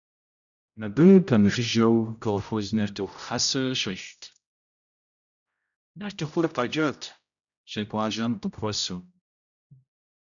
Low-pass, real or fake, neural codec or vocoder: 7.2 kHz; fake; codec, 16 kHz, 0.5 kbps, X-Codec, HuBERT features, trained on general audio